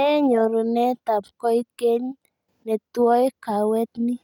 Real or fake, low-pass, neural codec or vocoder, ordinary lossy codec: real; 19.8 kHz; none; none